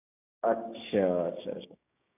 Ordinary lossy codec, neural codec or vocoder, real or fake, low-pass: none; codec, 16 kHz, 6 kbps, DAC; fake; 3.6 kHz